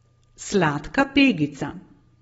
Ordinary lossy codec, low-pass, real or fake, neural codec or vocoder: AAC, 24 kbps; 19.8 kHz; real; none